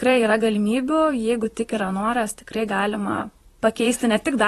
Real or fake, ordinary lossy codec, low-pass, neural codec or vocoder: fake; AAC, 32 kbps; 19.8 kHz; vocoder, 44.1 kHz, 128 mel bands, Pupu-Vocoder